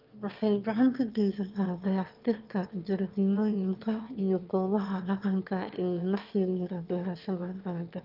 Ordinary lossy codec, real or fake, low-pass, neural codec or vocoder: Opus, 24 kbps; fake; 5.4 kHz; autoencoder, 22.05 kHz, a latent of 192 numbers a frame, VITS, trained on one speaker